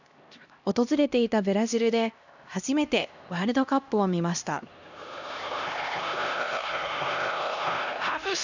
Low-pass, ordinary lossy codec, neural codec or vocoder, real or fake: 7.2 kHz; none; codec, 16 kHz, 1 kbps, X-Codec, HuBERT features, trained on LibriSpeech; fake